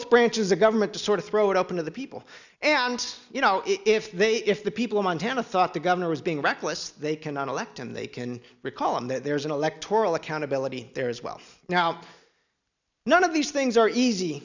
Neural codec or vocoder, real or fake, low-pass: none; real; 7.2 kHz